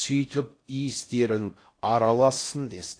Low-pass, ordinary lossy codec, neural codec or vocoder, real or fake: 9.9 kHz; AAC, 48 kbps; codec, 16 kHz in and 24 kHz out, 0.6 kbps, FocalCodec, streaming, 4096 codes; fake